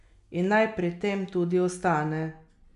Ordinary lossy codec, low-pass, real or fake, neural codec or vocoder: AAC, 96 kbps; 10.8 kHz; real; none